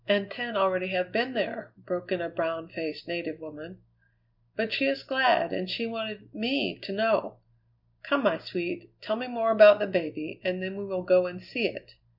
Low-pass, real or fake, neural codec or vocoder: 5.4 kHz; real; none